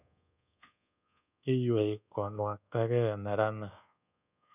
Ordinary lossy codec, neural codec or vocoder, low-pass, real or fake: none; codec, 24 kHz, 1.2 kbps, DualCodec; 3.6 kHz; fake